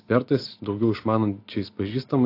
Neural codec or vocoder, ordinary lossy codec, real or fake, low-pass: none; AAC, 32 kbps; real; 5.4 kHz